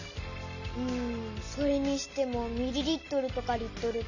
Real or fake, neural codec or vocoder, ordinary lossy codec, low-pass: real; none; none; 7.2 kHz